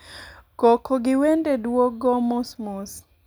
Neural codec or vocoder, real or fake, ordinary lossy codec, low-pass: none; real; none; none